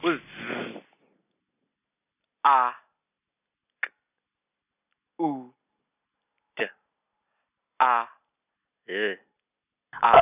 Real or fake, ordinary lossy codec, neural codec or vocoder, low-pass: real; none; none; 3.6 kHz